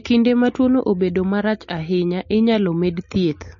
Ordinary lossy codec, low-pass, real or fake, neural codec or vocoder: MP3, 32 kbps; 7.2 kHz; real; none